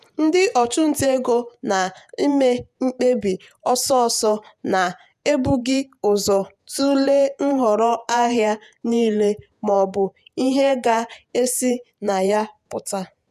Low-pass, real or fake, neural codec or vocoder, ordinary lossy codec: 14.4 kHz; fake; vocoder, 48 kHz, 128 mel bands, Vocos; none